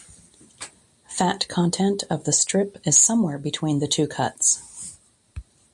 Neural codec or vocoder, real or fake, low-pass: none; real; 10.8 kHz